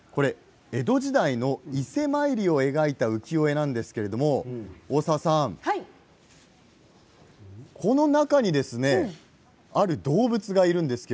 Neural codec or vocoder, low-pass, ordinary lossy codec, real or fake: none; none; none; real